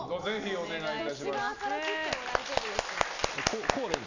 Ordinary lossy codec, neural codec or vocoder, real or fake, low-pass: none; none; real; 7.2 kHz